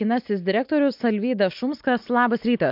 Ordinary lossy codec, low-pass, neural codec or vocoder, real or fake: MP3, 48 kbps; 5.4 kHz; none; real